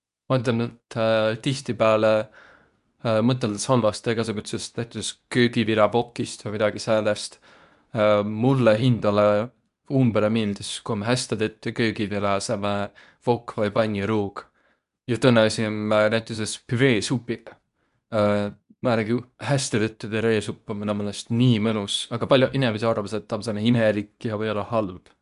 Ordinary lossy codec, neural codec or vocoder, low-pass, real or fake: none; codec, 24 kHz, 0.9 kbps, WavTokenizer, medium speech release version 2; 10.8 kHz; fake